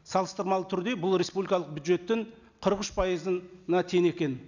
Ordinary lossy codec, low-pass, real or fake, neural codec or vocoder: none; 7.2 kHz; real; none